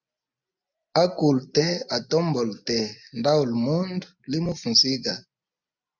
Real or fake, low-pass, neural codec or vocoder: real; 7.2 kHz; none